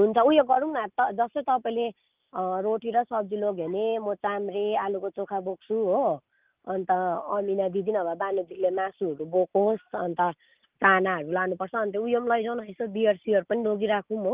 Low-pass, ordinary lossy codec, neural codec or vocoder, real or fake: 3.6 kHz; Opus, 24 kbps; none; real